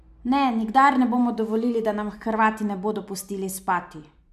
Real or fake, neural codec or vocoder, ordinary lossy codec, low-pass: real; none; none; 14.4 kHz